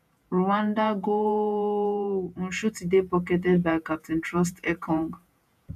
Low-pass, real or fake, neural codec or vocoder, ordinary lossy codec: 14.4 kHz; fake; vocoder, 48 kHz, 128 mel bands, Vocos; none